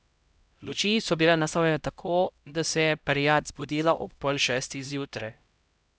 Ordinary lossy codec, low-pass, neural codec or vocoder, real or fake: none; none; codec, 16 kHz, 0.5 kbps, X-Codec, HuBERT features, trained on LibriSpeech; fake